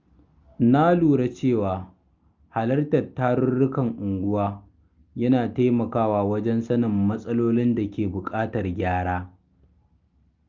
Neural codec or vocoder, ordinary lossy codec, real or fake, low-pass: none; Opus, 32 kbps; real; 7.2 kHz